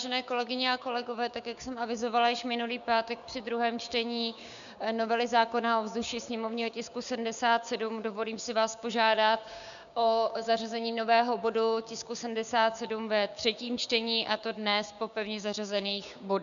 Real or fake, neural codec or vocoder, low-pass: fake; codec, 16 kHz, 6 kbps, DAC; 7.2 kHz